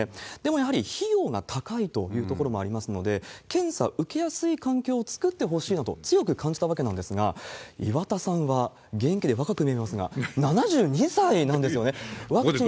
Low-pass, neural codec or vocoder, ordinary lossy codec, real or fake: none; none; none; real